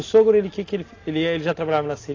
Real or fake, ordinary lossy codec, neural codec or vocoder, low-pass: real; AAC, 32 kbps; none; 7.2 kHz